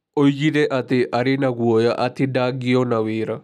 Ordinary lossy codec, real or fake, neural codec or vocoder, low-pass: none; real; none; 14.4 kHz